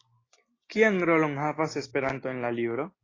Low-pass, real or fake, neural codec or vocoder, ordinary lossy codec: 7.2 kHz; real; none; AAC, 32 kbps